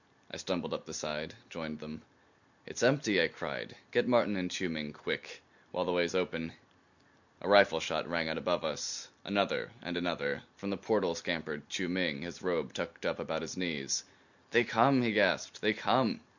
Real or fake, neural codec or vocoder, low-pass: real; none; 7.2 kHz